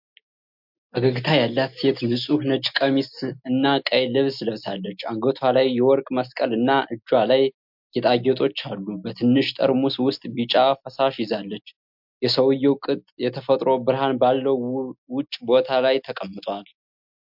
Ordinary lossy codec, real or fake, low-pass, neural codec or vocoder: MP3, 48 kbps; real; 5.4 kHz; none